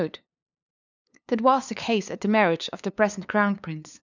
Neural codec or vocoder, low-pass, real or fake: codec, 16 kHz, 2 kbps, FunCodec, trained on LibriTTS, 25 frames a second; 7.2 kHz; fake